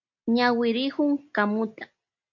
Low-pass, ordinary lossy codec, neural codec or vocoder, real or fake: 7.2 kHz; MP3, 48 kbps; none; real